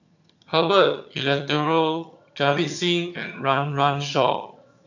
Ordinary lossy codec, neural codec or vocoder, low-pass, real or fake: none; vocoder, 22.05 kHz, 80 mel bands, HiFi-GAN; 7.2 kHz; fake